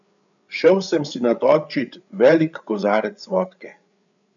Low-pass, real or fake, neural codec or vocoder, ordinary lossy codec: 7.2 kHz; fake; codec, 16 kHz, 8 kbps, FreqCodec, larger model; none